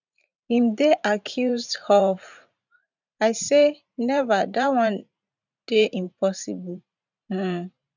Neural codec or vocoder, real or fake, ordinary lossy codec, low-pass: vocoder, 44.1 kHz, 128 mel bands every 512 samples, BigVGAN v2; fake; none; 7.2 kHz